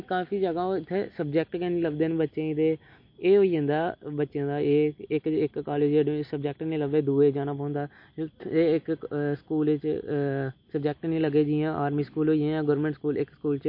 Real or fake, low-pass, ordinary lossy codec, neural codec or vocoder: real; 5.4 kHz; MP3, 32 kbps; none